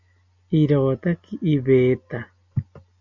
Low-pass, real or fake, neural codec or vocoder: 7.2 kHz; real; none